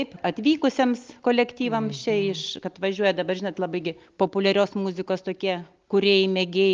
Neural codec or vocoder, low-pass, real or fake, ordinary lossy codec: none; 7.2 kHz; real; Opus, 32 kbps